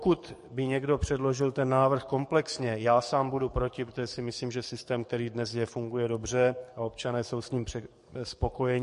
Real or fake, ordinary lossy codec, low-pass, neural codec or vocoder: fake; MP3, 48 kbps; 14.4 kHz; codec, 44.1 kHz, 7.8 kbps, DAC